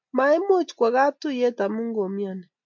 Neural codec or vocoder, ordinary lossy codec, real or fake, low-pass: none; MP3, 64 kbps; real; 7.2 kHz